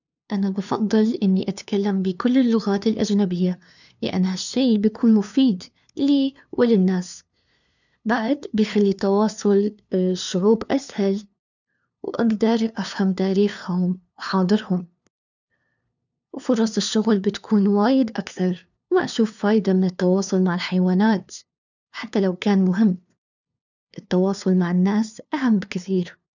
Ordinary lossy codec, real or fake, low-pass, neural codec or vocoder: none; fake; 7.2 kHz; codec, 16 kHz, 2 kbps, FunCodec, trained on LibriTTS, 25 frames a second